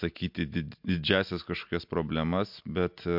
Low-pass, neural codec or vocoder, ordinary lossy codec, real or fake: 5.4 kHz; none; MP3, 48 kbps; real